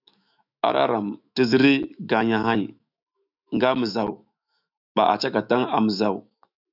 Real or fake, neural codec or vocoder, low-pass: fake; autoencoder, 48 kHz, 128 numbers a frame, DAC-VAE, trained on Japanese speech; 5.4 kHz